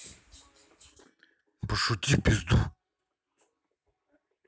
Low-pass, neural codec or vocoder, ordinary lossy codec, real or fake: none; none; none; real